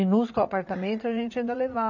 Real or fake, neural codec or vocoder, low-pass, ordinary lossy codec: fake; vocoder, 44.1 kHz, 80 mel bands, Vocos; 7.2 kHz; none